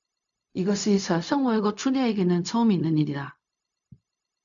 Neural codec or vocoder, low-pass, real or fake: codec, 16 kHz, 0.4 kbps, LongCat-Audio-Codec; 7.2 kHz; fake